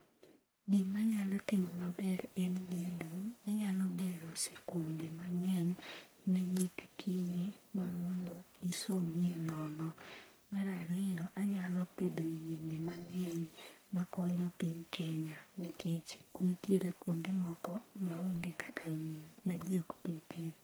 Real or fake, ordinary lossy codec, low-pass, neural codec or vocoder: fake; none; none; codec, 44.1 kHz, 1.7 kbps, Pupu-Codec